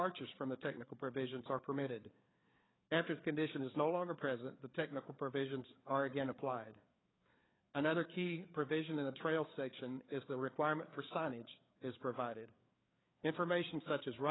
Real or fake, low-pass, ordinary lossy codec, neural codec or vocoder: fake; 7.2 kHz; AAC, 16 kbps; codec, 16 kHz, 4 kbps, FreqCodec, larger model